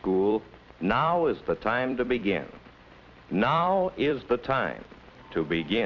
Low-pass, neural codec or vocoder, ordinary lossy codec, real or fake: 7.2 kHz; vocoder, 44.1 kHz, 128 mel bands every 256 samples, BigVGAN v2; Opus, 64 kbps; fake